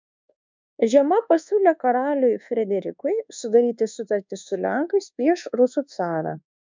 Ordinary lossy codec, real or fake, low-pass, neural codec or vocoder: MP3, 64 kbps; fake; 7.2 kHz; codec, 24 kHz, 1.2 kbps, DualCodec